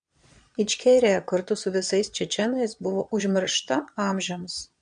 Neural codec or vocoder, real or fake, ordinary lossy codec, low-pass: vocoder, 22.05 kHz, 80 mel bands, Vocos; fake; MP3, 64 kbps; 9.9 kHz